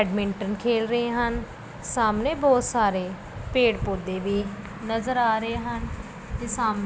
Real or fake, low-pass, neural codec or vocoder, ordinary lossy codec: real; none; none; none